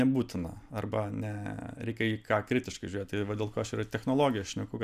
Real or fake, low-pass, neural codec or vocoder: real; 14.4 kHz; none